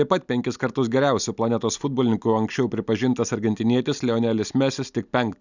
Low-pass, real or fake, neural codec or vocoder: 7.2 kHz; real; none